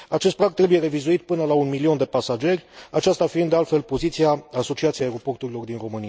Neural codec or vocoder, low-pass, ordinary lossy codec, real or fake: none; none; none; real